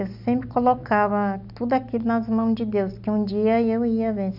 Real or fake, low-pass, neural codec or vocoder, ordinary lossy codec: real; 5.4 kHz; none; none